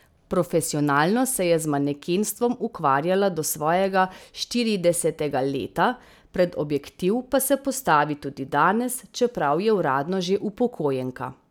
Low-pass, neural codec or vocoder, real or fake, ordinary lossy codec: none; none; real; none